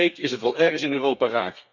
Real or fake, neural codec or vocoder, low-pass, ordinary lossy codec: fake; codec, 32 kHz, 1.9 kbps, SNAC; 7.2 kHz; none